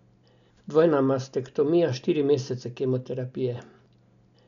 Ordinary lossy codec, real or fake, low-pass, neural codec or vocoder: none; real; 7.2 kHz; none